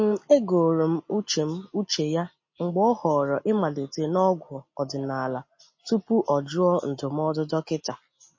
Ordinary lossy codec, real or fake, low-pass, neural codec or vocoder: MP3, 32 kbps; real; 7.2 kHz; none